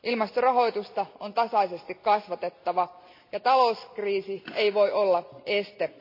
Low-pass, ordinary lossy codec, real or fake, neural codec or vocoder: 5.4 kHz; MP3, 32 kbps; real; none